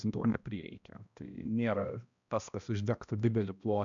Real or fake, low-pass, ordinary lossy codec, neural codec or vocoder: fake; 7.2 kHz; MP3, 96 kbps; codec, 16 kHz, 1 kbps, X-Codec, HuBERT features, trained on balanced general audio